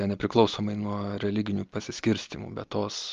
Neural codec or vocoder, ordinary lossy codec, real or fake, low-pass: none; Opus, 32 kbps; real; 7.2 kHz